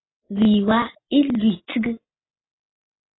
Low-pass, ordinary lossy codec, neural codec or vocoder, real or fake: 7.2 kHz; AAC, 16 kbps; none; real